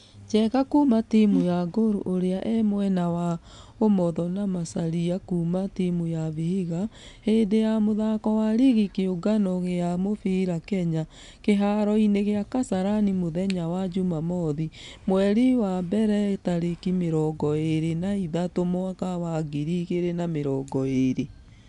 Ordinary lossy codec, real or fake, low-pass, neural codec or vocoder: none; real; 10.8 kHz; none